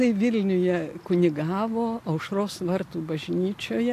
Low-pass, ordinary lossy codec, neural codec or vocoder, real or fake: 14.4 kHz; AAC, 64 kbps; none; real